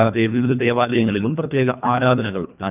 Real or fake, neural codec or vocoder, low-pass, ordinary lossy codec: fake; codec, 24 kHz, 1.5 kbps, HILCodec; 3.6 kHz; none